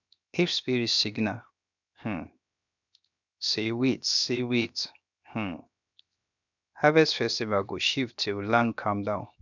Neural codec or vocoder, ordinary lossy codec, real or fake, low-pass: codec, 16 kHz, 0.7 kbps, FocalCodec; none; fake; 7.2 kHz